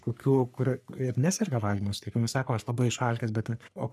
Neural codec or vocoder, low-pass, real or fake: codec, 44.1 kHz, 3.4 kbps, Pupu-Codec; 14.4 kHz; fake